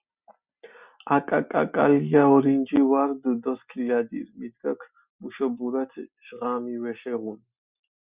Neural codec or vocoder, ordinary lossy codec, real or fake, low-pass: none; Opus, 64 kbps; real; 3.6 kHz